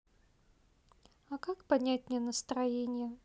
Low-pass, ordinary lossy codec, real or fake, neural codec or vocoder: none; none; real; none